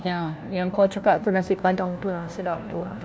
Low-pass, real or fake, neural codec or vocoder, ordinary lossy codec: none; fake; codec, 16 kHz, 1 kbps, FunCodec, trained on LibriTTS, 50 frames a second; none